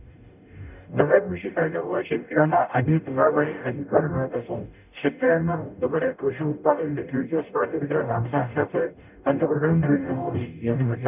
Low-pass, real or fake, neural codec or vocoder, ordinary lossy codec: 3.6 kHz; fake; codec, 44.1 kHz, 0.9 kbps, DAC; none